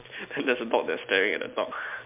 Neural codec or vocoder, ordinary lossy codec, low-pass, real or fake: none; MP3, 32 kbps; 3.6 kHz; real